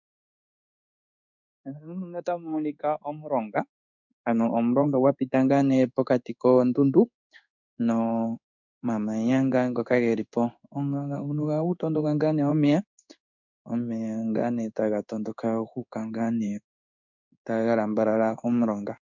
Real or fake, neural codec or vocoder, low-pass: fake; codec, 16 kHz in and 24 kHz out, 1 kbps, XY-Tokenizer; 7.2 kHz